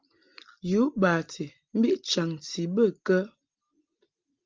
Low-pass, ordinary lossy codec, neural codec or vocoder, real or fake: 7.2 kHz; Opus, 32 kbps; none; real